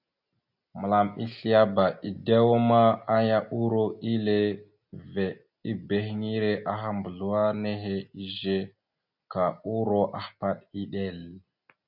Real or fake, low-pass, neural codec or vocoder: real; 5.4 kHz; none